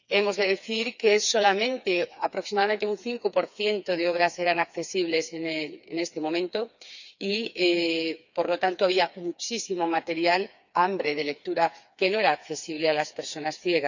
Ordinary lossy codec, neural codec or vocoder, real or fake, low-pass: none; codec, 16 kHz, 4 kbps, FreqCodec, smaller model; fake; 7.2 kHz